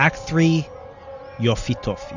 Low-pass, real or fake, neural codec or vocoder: 7.2 kHz; real; none